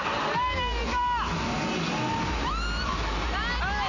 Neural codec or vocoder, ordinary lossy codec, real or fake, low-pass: none; none; real; 7.2 kHz